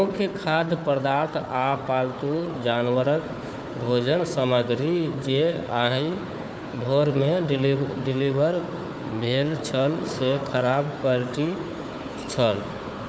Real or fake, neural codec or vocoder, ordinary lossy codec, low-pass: fake; codec, 16 kHz, 4 kbps, FunCodec, trained on Chinese and English, 50 frames a second; none; none